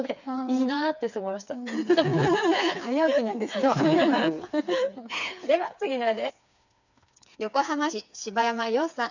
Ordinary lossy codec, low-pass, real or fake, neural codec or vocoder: none; 7.2 kHz; fake; codec, 16 kHz, 4 kbps, FreqCodec, smaller model